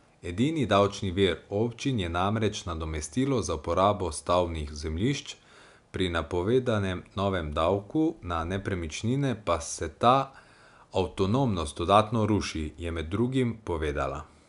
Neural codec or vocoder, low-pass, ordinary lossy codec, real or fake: none; 10.8 kHz; MP3, 96 kbps; real